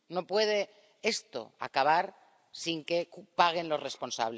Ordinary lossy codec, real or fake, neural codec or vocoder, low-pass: none; real; none; none